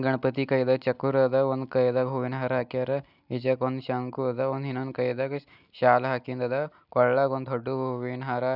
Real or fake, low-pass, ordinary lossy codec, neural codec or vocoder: real; 5.4 kHz; none; none